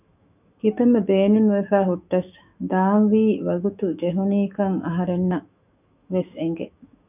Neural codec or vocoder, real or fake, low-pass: autoencoder, 48 kHz, 128 numbers a frame, DAC-VAE, trained on Japanese speech; fake; 3.6 kHz